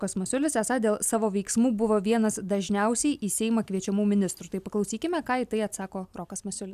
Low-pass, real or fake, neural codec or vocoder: 14.4 kHz; real; none